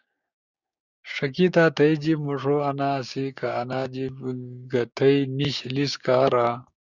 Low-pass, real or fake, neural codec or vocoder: 7.2 kHz; fake; codec, 16 kHz, 6 kbps, DAC